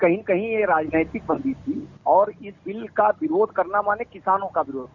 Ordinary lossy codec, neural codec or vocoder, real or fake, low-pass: none; none; real; 7.2 kHz